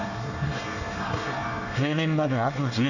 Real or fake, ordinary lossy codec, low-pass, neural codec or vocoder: fake; none; 7.2 kHz; codec, 24 kHz, 1 kbps, SNAC